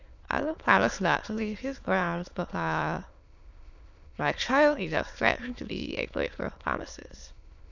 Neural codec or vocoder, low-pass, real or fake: autoencoder, 22.05 kHz, a latent of 192 numbers a frame, VITS, trained on many speakers; 7.2 kHz; fake